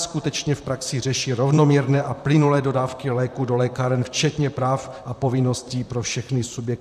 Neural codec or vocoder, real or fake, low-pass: vocoder, 44.1 kHz, 128 mel bands, Pupu-Vocoder; fake; 14.4 kHz